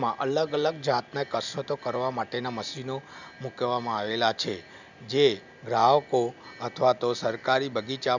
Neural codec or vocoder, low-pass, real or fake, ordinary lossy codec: none; 7.2 kHz; real; none